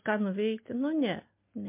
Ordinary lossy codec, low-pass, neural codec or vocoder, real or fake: MP3, 24 kbps; 3.6 kHz; none; real